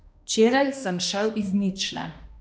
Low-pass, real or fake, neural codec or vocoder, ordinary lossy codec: none; fake; codec, 16 kHz, 1 kbps, X-Codec, HuBERT features, trained on balanced general audio; none